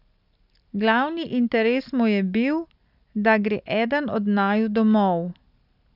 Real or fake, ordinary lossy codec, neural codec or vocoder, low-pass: real; none; none; 5.4 kHz